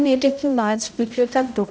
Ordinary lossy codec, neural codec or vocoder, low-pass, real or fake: none; codec, 16 kHz, 0.5 kbps, X-Codec, HuBERT features, trained on balanced general audio; none; fake